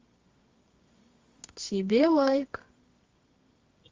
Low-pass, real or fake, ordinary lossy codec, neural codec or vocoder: 7.2 kHz; fake; Opus, 32 kbps; codec, 24 kHz, 0.9 kbps, WavTokenizer, medium music audio release